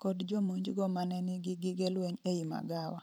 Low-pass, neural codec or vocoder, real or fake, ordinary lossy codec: none; vocoder, 44.1 kHz, 128 mel bands every 512 samples, BigVGAN v2; fake; none